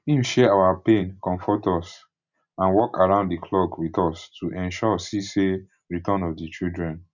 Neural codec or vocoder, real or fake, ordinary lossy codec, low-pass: none; real; none; 7.2 kHz